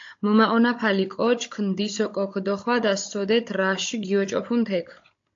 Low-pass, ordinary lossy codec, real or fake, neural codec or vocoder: 7.2 kHz; AAC, 48 kbps; fake; codec, 16 kHz, 16 kbps, FunCodec, trained on Chinese and English, 50 frames a second